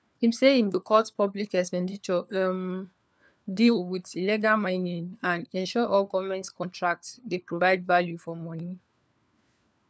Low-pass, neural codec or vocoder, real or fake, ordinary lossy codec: none; codec, 16 kHz, 4 kbps, FunCodec, trained on LibriTTS, 50 frames a second; fake; none